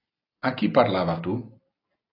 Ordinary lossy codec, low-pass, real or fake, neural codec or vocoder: AAC, 24 kbps; 5.4 kHz; real; none